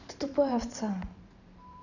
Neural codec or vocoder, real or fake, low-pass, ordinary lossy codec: vocoder, 44.1 kHz, 128 mel bands every 512 samples, BigVGAN v2; fake; 7.2 kHz; none